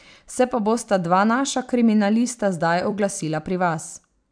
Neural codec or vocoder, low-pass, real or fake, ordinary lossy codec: vocoder, 44.1 kHz, 128 mel bands every 256 samples, BigVGAN v2; 9.9 kHz; fake; MP3, 96 kbps